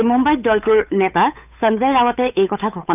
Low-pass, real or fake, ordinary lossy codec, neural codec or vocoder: 3.6 kHz; fake; none; codec, 16 kHz, 8 kbps, FunCodec, trained on Chinese and English, 25 frames a second